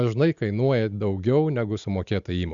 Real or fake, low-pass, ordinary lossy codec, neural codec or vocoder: real; 7.2 kHz; Opus, 64 kbps; none